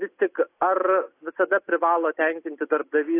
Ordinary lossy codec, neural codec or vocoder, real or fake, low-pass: AAC, 24 kbps; none; real; 3.6 kHz